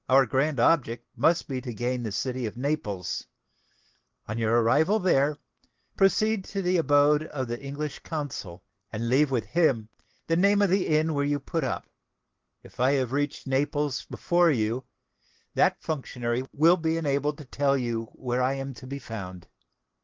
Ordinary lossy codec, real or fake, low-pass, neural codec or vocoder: Opus, 16 kbps; real; 7.2 kHz; none